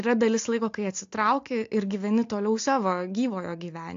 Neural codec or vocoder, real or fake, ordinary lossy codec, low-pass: none; real; AAC, 48 kbps; 7.2 kHz